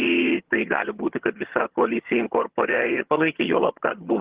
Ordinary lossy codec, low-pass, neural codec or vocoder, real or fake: Opus, 16 kbps; 3.6 kHz; vocoder, 22.05 kHz, 80 mel bands, HiFi-GAN; fake